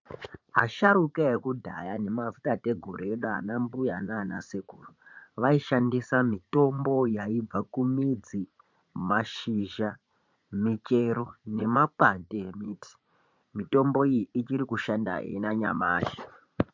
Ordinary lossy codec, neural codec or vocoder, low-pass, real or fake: MP3, 64 kbps; vocoder, 44.1 kHz, 80 mel bands, Vocos; 7.2 kHz; fake